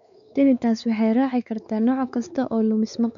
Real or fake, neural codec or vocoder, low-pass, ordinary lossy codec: fake; codec, 16 kHz, 4 kbps, X-Codec, WavLM features, trained on Multilingual LibriSpeech; 7.2 kHz; MP3, 96 kbps